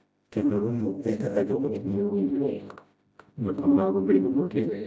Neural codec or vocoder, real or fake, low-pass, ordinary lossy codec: codec, 16 kHz, 0.5 kbps, FreqCodec, smaller model; fake; none; none